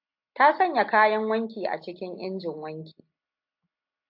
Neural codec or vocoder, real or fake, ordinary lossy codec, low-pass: none; real; AAC, 48 kbps; 5.4 kHz